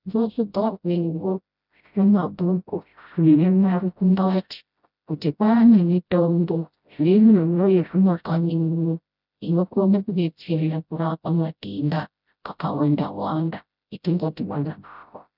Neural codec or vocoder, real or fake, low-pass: codec, 16 kHz, 0.5 kbps, FreqCodec, smaller model; fake; 5.4 kHz